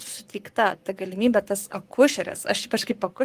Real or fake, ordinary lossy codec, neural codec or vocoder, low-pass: fake; Opus, 24 kbps; codec, 44.1 kHz, 7.8 kbps, Pupu-Codec; 14.4 kHz